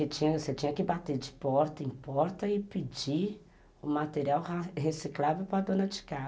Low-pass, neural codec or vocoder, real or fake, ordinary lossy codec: none; none; real; none